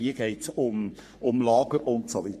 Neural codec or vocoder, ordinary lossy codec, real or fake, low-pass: codec, 44.1 kHz, 3.4 kbps, Pupu-Codec; MP3, 64 kbps; fake; 14.4 kHz